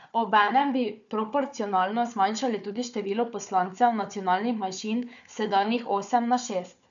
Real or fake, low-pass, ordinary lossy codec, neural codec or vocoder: fake; 7.2 kHz; none; codec, 16 kHz, 4 kbps, FunCodec, trained on Chinese and English, 50 frames a second